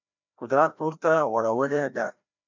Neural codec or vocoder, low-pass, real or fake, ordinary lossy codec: codec, 16 kHz, 1 kbps, FreqCodec, larger model; 7.2 kHz; fake; MP3, 64 kbps